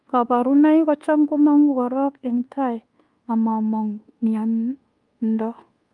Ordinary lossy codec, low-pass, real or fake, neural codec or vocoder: Opus, 32 kbps; 10.8 kHz; fake; codec, 24 kHz, 1.2 kbps, DualCodec